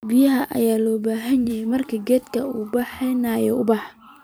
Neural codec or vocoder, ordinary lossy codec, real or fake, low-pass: vocoder, 44.1 kHz, 128 mel bands every 256 samples, BigVGAN v2; none; fake; none